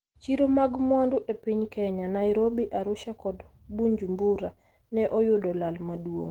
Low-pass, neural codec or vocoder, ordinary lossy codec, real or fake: 19.8 kHz; autoencoder, 48 kHz, 128 numbers a frame, DAC-VAE, trained on Japanese speech; Opus, 16 kbps; fake